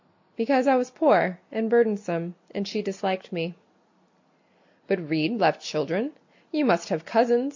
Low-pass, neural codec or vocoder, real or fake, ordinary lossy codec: 7.2 kHz; none; real; MP3, 48 kbps